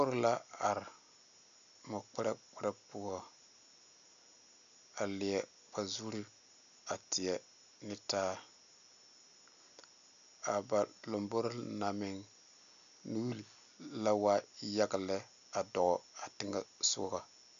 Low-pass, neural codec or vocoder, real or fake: 7.2 kHz; none; real